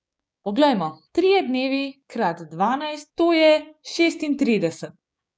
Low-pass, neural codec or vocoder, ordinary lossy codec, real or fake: none; codec, 16 kHz, 6 kbps, DAC; none; fake